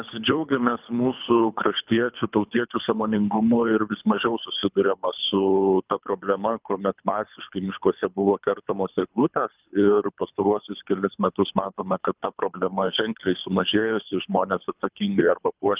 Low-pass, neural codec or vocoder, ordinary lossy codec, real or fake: 3.6 kHz; codec, 24 kHz, 3 kbps, HILCodec; Opus, 24 kbps; fake